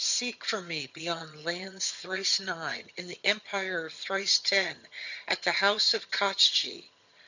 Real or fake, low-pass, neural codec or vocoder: fake; 7.2 kHz; vocoder, 22.05 kHz, 80 mel bands, HiFi-GAN